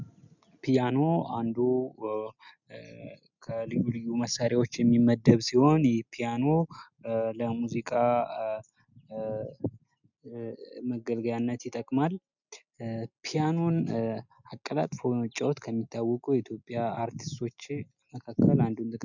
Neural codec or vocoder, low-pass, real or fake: none; 7.2 kHz; real